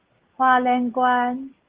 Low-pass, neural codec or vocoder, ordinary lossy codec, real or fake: 3.6 kHz; none; Opus, 16 kbps; real